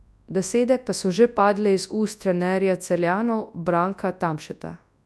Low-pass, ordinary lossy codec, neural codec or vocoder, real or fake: none; none; codec, 24 kHz, 0.9 kbps, WavTokenizer, large speech release; fake